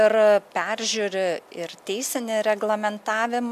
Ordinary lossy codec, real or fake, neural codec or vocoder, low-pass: AAC, 96 kbps; real; none; 14.4 kHz